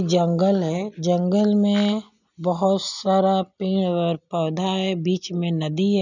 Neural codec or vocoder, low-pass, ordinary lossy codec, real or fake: none; 7.2 kHz; none; real